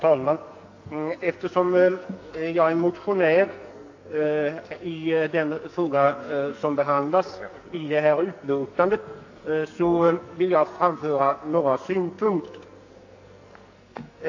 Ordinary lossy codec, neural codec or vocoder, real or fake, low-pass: AAC, 48 kbps; codec, 44.1 kHz, 2.6 kbps, SNAC; fake; 7.2 kHz